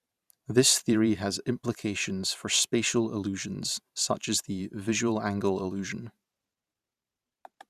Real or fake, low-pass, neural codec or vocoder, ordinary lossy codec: fake; 14.4 kHz; vocoder, 44.1 kHz, 128 mel bands every 512 samples, BigVGAN v2; Opus, 64 kbps